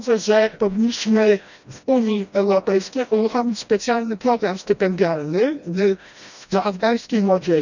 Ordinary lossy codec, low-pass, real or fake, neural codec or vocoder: none; 7.2 kHz; fake; codec, 16 kHz, 1 kbps, FreqCodec, smaller model